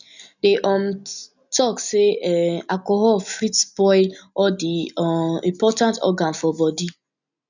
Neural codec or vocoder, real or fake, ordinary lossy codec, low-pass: none; real; none; 7.2 kHz